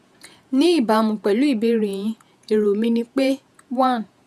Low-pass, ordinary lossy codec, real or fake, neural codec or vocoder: 14.4 kHz; none; fake; vocoder, 48 kHz, 128 mel bands, Vocos